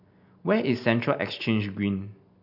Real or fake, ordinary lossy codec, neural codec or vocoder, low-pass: real; none; none; 5.4 kHz